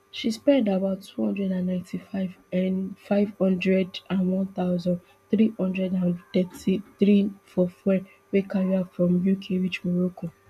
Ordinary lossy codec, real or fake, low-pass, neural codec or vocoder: none; real; 14.4 kHz; none